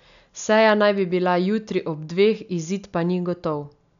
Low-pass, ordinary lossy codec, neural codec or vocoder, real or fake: 7.2 kHz; none; none; real